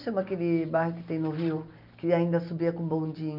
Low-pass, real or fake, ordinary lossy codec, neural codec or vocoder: 5.4 kHz; real; none; none